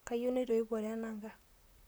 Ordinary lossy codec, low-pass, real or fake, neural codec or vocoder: none; none; fake; vocoder, 44.1 kHz, 128 mel bands, Pupu-Vocoder